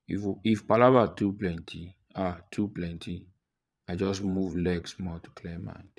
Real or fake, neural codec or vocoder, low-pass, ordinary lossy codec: fake; vocoder, 22.05 kHz, 80 mel bands, Vocos; none; none